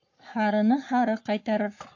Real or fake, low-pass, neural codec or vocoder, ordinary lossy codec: fake; 7.2 kHz; codec, 44.1 kHz, 7.8 kbps, Pupu-Codec; AAC, 48 kbps